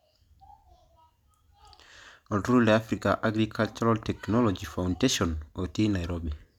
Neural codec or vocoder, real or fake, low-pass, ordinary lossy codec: none; real; 19.8 kHz; none